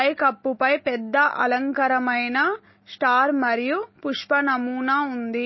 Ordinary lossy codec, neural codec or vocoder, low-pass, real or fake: MP3, 24 kbps; none; 7.2 kHz; real